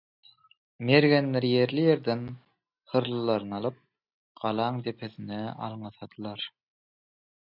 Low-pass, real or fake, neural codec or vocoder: 5.4 kHz; real; none